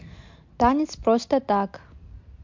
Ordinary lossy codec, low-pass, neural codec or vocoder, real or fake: MP3, 48 kbps; 7.2 kHz; none; real